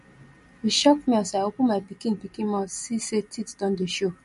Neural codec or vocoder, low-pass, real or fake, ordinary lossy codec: none; 14.4 kHz; real; MP3, 48 kbps